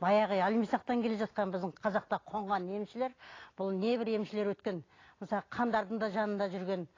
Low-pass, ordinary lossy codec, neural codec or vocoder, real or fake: 7.2 kHz; AAC, 32 kbps; none; real